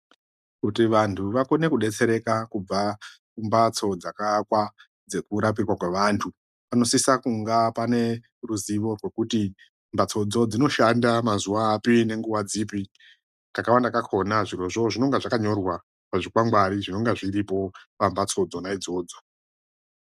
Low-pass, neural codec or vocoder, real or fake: 14.4 kHz; none; real